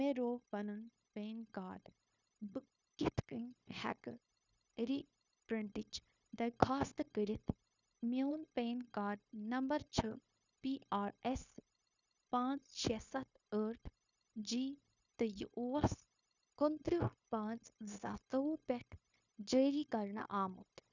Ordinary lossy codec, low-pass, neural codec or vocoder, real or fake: none; 7.2 kHz; codec, 16 kHz, 0.9 kbps, LongCat-Audio-Codec; fake